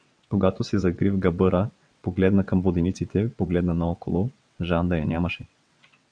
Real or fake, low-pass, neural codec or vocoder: fake; 9.9 kHz; vocoder, 22.05 kHz, 80 mel bands, WaveNeXt